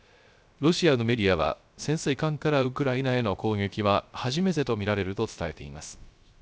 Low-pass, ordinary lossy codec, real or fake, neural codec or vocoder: none; none; fake; codec, 16 kHz, 0.3 kbps, FocalCodec